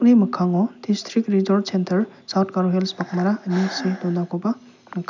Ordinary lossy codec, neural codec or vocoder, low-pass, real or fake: none; none; 7.2 kHz; real